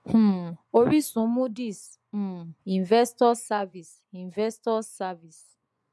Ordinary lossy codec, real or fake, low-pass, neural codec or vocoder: none; fake; none; vocoder, 24 kHz, 100 mel bands, Vocos